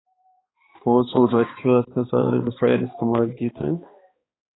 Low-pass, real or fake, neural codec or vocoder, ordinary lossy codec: 7.2 kHz; fake; codec, 16 kHz, 2 kbps, X-Codec, HuBERT features, trained on balanced general audio; AAC, 16 kbps